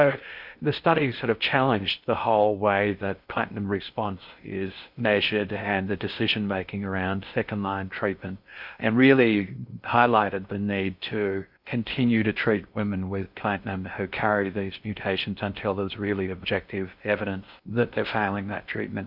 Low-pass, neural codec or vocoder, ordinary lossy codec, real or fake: 5.4 kHz; codec, 16 kHz in and 24 kHz out, 0.6 kbps, FocalCodec, streaming, 2048 codes; MP3, 48 kbps; fake